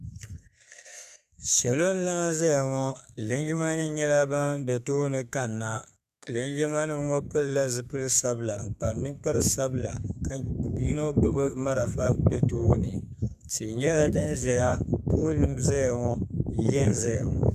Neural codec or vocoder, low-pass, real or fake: codec, 32 kHz, 1.9 kbps, SNAC; 14.4 kHz; fake